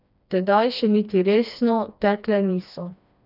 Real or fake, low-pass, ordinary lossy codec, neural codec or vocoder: fake; 5.4 kHz; none; codec, 16 kHz, 2 kbps, FreqCodec, smaller model